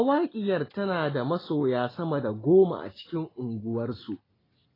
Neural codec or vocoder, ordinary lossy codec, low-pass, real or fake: none; AAC, 24 kbps; 5.4 kHz; real